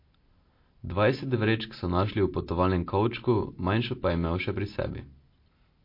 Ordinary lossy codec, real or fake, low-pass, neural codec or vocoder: MP3, 32 kbps; real; 5.4 kHz; none